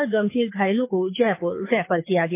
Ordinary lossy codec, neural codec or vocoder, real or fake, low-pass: MP3, 24 kbps; codec, 24 kHz, 6 kbps, HILCodec; fake; 3.6 kHz